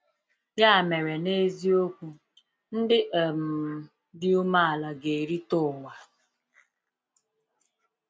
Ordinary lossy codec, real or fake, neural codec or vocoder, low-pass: none; real; none; none